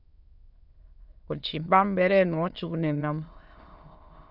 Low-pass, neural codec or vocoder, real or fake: 5.4 kHz; autoencoder, 22.05 kHz, a latent of 192 numbers a frame, VITS, trained on many speakers; fake